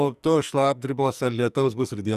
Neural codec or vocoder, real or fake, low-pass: codec, 44.1 kHz, 2.6 kbps, SNAC; fake; 14.4 kHz